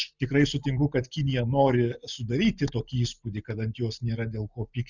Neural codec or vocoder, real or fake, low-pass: none; real; 7.2 kHz